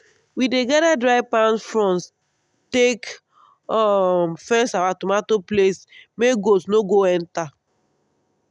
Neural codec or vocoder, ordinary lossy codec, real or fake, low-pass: none; none; real; 10.8 kHz